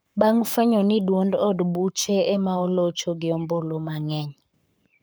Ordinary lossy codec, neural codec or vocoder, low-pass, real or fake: none; codec, 44.1 kHz, 7.8 kbps, Pupu-Codec; none; fake